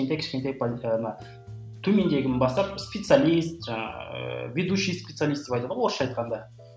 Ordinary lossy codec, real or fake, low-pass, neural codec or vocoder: none; real; none; none